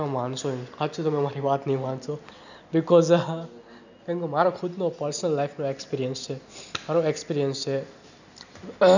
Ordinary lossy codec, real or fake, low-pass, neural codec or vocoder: none; real; 7.2 kHz; none